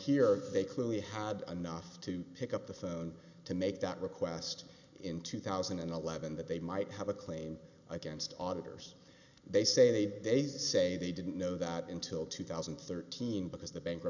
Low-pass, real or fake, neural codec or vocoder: 7.2 kHz; real; none